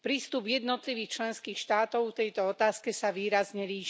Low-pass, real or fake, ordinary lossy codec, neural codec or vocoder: none; real; none; none